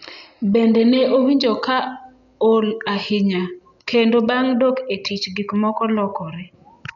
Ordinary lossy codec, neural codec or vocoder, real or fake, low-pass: none; none; real; 7.2 kHz